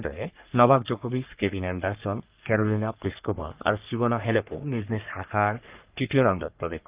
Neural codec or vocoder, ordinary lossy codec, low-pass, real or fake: codec, 44.1 kHz, 3.4 kbps, Pupu-Codec; Opus, 24 kbps; 3.6 kHz; fake